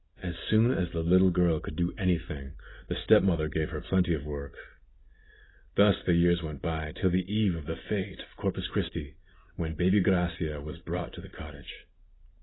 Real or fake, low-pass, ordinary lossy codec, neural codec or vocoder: real; 7.2 kHz; AAC, 16 kbps; none